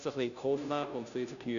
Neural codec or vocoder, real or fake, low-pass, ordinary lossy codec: codec, 16 kHz, 0.5 kbps, FunCodec, trained on Chinese and English, 25 frames a second; fake; 7.2 kHz; none